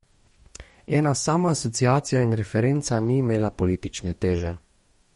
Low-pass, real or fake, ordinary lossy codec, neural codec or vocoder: 19.8 kHz; fake; MP3, 48 kbps; codec, 44.1 kHz, 2.6 kbps, DAC